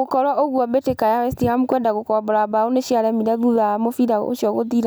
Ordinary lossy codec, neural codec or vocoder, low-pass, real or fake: none; none; none; real